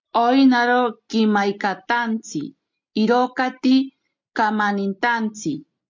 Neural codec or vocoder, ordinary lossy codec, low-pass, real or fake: none; MP3, 64 kbps; 7.2 kHz; real